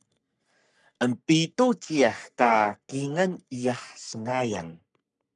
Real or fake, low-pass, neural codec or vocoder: fake; 10.8 kHz; codec, 44.1 kHz, 3.4 kbps, Pupu-Codec